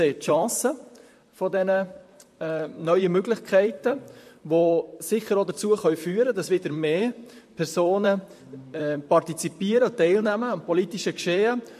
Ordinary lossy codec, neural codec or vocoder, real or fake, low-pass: MP3, 64 kbps; vocoder, 44.1 kHz, 128 mel bands, Pupu-Vocoder; fake; 14.4 kHz